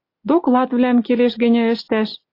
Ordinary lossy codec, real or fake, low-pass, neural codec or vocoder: AAC, 32 kbps; real; 5.4 kHz; none